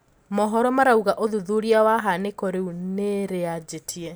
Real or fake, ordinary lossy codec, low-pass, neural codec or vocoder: real; none; none; none